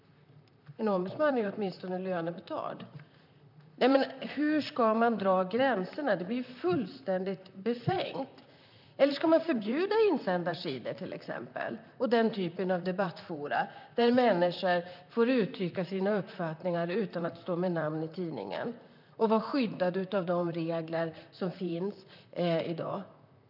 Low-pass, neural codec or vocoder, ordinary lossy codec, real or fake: 5.4 kHz; vocoder, 44.1 kHz, 128 mel bands, Pupu-Vocoder; none; fake